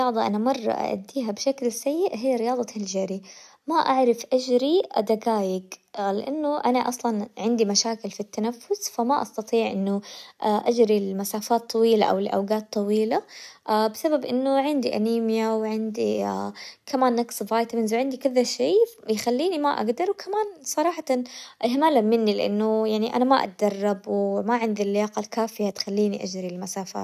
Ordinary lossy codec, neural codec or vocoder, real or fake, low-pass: none; none; real; 14.4 kHz